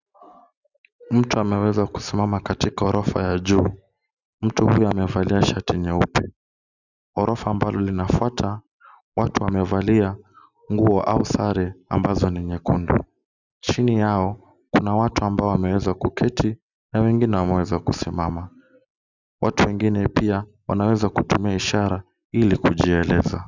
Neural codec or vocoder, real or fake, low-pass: none; real; 7.2 kHz